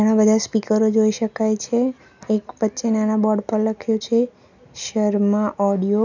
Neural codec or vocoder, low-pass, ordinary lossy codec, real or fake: none; 7.2 kHz; none; real